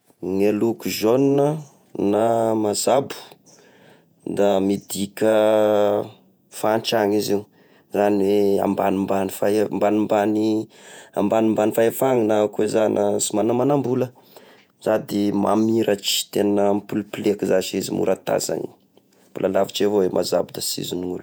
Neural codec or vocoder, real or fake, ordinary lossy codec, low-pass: vocoder, 48 kHz, 128 mel bands, Vocos; fake; none; none